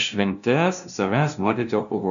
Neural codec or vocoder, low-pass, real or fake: codec, 16 kHz, 0.5 kbps, FunCodec, trained on LibriTTS, 25 frames a second; 7.2 kHz; fake